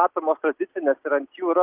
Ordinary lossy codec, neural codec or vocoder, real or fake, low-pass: Opus, 32 kbps; none; real; 3.6 kHz